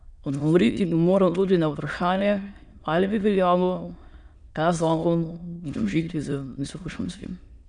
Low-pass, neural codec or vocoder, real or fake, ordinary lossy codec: 9.9 kHz; autoencoder, 22.05 kHz, a latent of 192 numbers a frame, VITS, trained on many speakers; fake; none